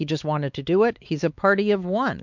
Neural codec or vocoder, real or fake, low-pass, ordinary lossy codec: codec, 16 kHz, 4 kbps, X-Codec, WavLM features, trained on Multilingual LibriSpeech; fake; 7.2 kHz; MP3, 64 kbps